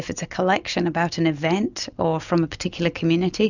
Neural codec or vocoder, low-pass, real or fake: none; 7.2 kHz; real